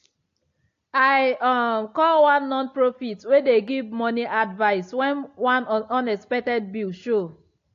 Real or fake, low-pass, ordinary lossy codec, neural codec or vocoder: real; 7.2 kHz; AAC, 48 kbps; none